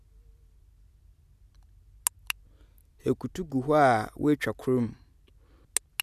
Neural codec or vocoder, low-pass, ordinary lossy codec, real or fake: none; 14.4 kHz; none; real